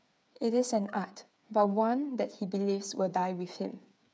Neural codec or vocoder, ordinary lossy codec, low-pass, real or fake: codec, 16 kHz, 8 kbps, FreqCodec, smaller model; none; none; fake